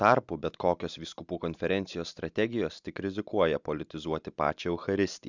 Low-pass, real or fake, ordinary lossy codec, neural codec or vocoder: 7.2 kHz; real; Opus, 64 kbps; none